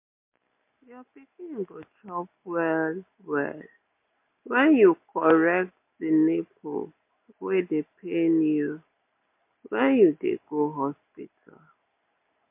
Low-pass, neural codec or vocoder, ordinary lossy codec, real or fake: 3.6 kHz; none; MP3, 24 kbps; real